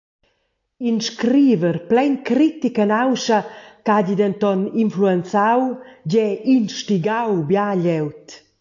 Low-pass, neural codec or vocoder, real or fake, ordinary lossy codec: 7.2 kHz; none; real; AAC, 48 kbps